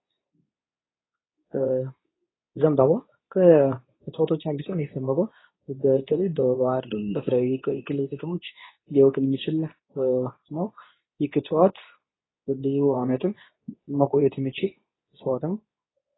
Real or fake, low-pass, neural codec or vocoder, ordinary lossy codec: fake; 7.2 kHz; codec, 24 kHz, 0.9 kbps, WavTokenizer, medium speech release version 2; AAC, 16 kbps